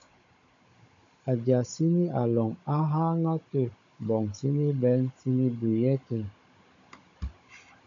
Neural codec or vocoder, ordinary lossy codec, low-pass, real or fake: codec, 16 kHz, 16 kbps, FunCodec, trained on Chinese and English, 50 frames a second; AAC, 64 kbps; 7.2 kHz; fake